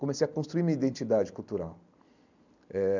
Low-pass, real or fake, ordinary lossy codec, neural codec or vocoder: 7.2 kHz; real; none; none